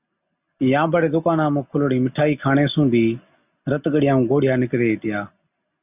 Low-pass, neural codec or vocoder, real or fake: 3.6 kHz; none; real